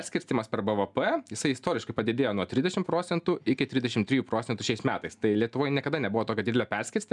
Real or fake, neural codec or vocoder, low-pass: real; none; 10.8 kHz